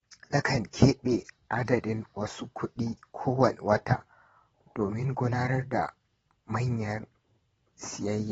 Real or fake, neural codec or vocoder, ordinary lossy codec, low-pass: fake; vocoder, 22.05 kHz, 80 mel bands, WaveNeXt; AAC, 24 kbps; 9.9 kHz